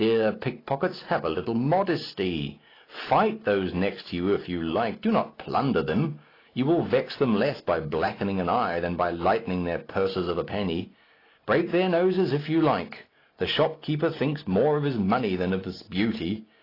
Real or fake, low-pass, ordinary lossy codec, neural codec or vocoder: real; 5.4 kHz; AAC, 24 kbps; none